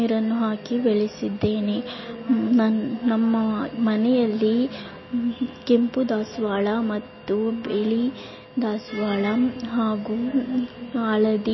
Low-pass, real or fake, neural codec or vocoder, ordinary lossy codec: 7.2 kHz; real; none; MP3, 24 kbps